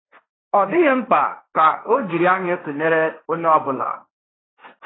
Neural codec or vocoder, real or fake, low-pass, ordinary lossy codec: codec, 16 kHz, 1.1 kbps, Voila-Tokenizer; fake; 7.2 kHz; AAC, 16 kbps